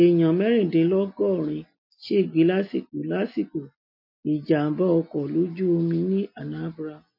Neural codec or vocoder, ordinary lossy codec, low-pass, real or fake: none; MP3, 32 kbps; 5.4 kHz; real